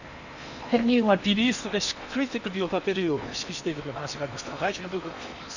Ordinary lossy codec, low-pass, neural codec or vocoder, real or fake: none; 7.2 kHz; codec, 16 kHz in and 24 kHz out, 0.8 kbps, FocalCodec, streaming, 65536 codes; fake